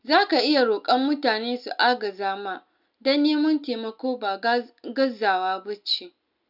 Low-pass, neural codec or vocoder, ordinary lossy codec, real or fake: 5.4 kHz; none; none; real